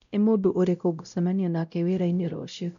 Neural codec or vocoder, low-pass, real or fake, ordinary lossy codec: codec, 16 kHz, 0.5 kbps, X-Codec, WavLM features, trained on Multilingual LibriSpeech; 7.2 kHz; fake; MP3, 96 kbps